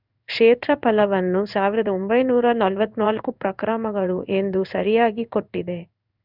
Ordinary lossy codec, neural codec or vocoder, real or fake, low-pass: none; codec, 16 kHz in and 24 kHz out, 1 kbps, XY-Tokenizer; fake; 5.4 kHz